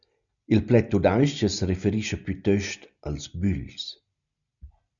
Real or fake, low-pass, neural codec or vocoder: real; 7.2 kHz; none